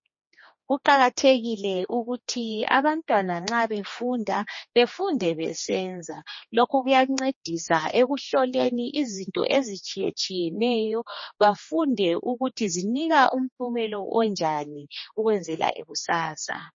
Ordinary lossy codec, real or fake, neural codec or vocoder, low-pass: MP3, 32 kbps; fake; codec, 16 kHz, 2 kbps, X-Codec, HuBERT features, trained on general audio; 7.2 kHz